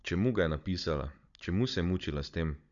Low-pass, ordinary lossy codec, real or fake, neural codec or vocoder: 7.2 kHz; none; fake; codec, 16 kHz, 16 kbps, FunCodec, trained on Chinese and English, 50 frames a second